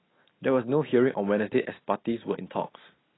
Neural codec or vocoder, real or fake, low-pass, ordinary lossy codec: none; real; 7.2 kHz; AAC, 16 kbps